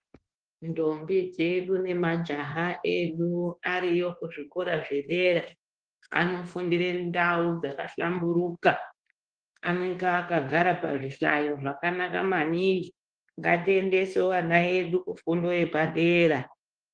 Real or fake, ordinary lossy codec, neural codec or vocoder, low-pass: fake; Opus, 16 kbps; codec, 24 kHz, 1.2 kbps, DualCodec; 9.9 kHz